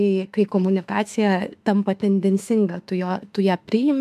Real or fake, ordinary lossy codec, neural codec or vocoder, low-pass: fake; MP3, 96 kbps; autoencoder, 48 kHz, 32 numbers a frame, DAC-VAE, trained on Japanese speech; 14.4 kHz